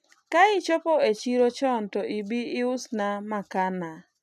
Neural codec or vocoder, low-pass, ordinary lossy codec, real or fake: none; 14.4 kHz; none; real